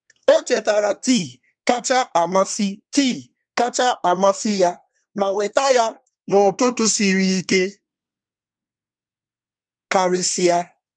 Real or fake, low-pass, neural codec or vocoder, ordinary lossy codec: fake; 9.9 kHz; codec, 24 kHz, 1 kbps, SNAC; none